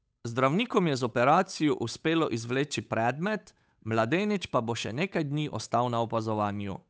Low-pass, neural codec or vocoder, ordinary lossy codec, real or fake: none; codec, 16 kHz, 8 kbps, FunCodec, trained on Chinese and English, 25 frames a second; none; fake